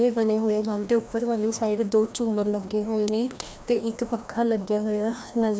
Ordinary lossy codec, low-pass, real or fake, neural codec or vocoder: none; none; fake; codec, 16 kHz, 1 kbps, FreqCodec, larger model